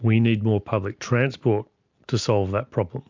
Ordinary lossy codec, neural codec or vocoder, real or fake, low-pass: MP3, 64 kbps; none; real; 7.2 kHz